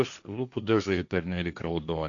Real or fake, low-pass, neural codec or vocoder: fake; 7.2 kHz; codec, 16 kHz, 1.1 kbps, Voila-Tokenizer